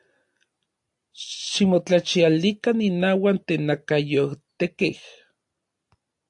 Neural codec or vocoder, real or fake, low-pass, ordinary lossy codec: none; real; 10.8 kHz; AAC, 64 kbps